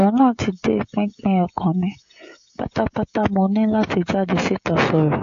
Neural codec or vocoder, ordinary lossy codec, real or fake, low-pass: codec, 16 kHz, 16 kbps, FreqCodec, smaller model; AAC, 48 kbps; fake; 7.2 kHz